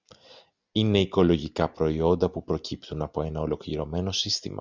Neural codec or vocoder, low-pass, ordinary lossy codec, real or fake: none; 7.2 kHz; Opus, 64 kbps; real